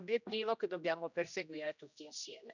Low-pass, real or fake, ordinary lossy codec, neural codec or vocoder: 7.2 kHz; fake; none; codec, 16 kHz, 1 kbps, X-Codec, HuBERT features, trained on general audio